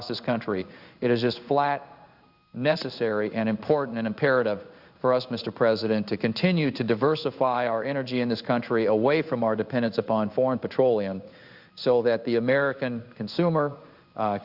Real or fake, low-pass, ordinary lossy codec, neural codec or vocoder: fake; 5.4 kHz; Opus, 64 kbps; codec, 16 kHz in and 24 kHz out, 1 kbps, XY-Tokenizer